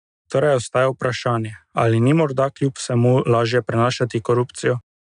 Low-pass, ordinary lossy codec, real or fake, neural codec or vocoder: 9.9 kHz; none; real; none